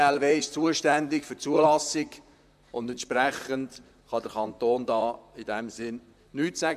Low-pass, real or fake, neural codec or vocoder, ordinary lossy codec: 14.4 kHz; fake; vocoder, 44.1 kHz, 128 mel bands, Pupu-Vocoder; none